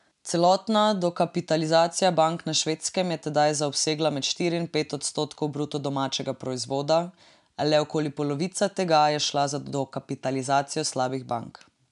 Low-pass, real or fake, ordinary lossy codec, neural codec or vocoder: 10.8 kHz; real; none; none